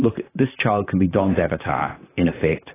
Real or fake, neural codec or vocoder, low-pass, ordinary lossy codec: fake; vocoder, 44.1 kHz, 128 mel bands, Pupu-Vocoder; 3.6 kHz; AAC, 16 kbps